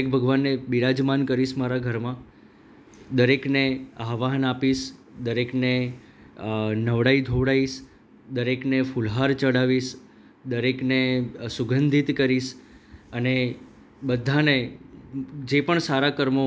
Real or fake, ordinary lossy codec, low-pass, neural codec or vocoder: real; none; none; none